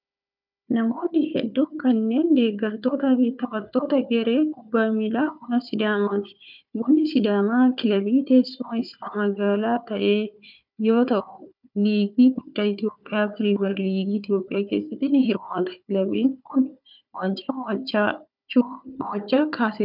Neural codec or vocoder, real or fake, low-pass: codec, 16 kHz, 4 kbps, FunCodec, trained on Chinese and English, 50 frames a second; fake; 5.4 kHz